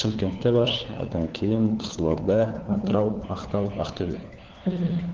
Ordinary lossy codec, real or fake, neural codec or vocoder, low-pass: Opus, 16 kbps; fake; codec, 16 kHz, 4 kbps, FunCodec, trained on LibriTTS, 50 frames a second; 7.2 kHz